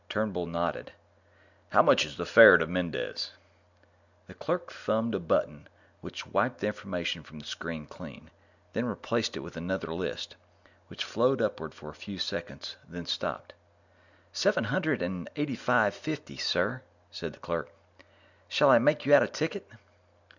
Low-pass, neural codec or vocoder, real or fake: 7.2 kHz; none; real